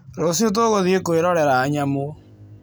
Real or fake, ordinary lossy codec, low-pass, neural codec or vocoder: real; none; none; none